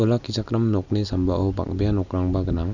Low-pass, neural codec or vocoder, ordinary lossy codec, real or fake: 7.2 kHz; none; none; real